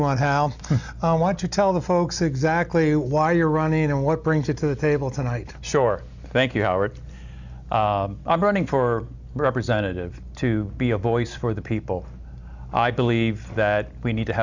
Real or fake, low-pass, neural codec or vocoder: real; 7.2 kHz; none